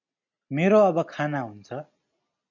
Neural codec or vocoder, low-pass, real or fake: none; 7.2 kHz; real